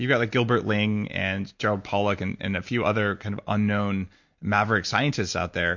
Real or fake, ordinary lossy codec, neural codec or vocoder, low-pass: real; MP3, 48 kbps; none; 7.2 kHz